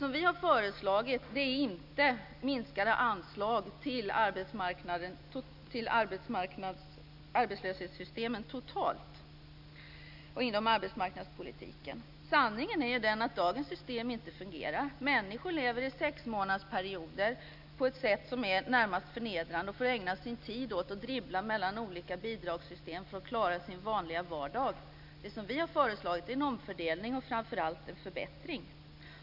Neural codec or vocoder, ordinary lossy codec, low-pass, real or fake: none; none; 5.4 kHz; real